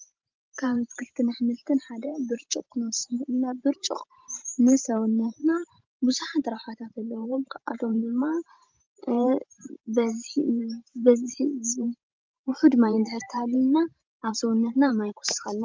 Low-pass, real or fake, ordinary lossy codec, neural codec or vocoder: 7.2 kHz; fake; Opus, 32 kbps; vocoder, 44.1 kHz, 128 mel bands every 512 samples, BigVGAN v2